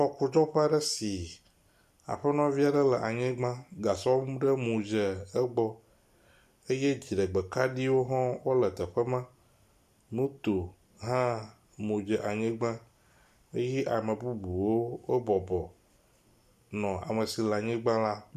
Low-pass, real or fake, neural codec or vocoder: 14.4 kHz; real; none